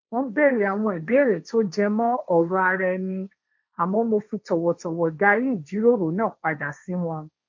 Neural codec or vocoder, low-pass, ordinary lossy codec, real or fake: codec, 16 kHz, 1.1 kbps, Voila-Tokenizer; 7.2 kHz; MP3, 48 kbps; fake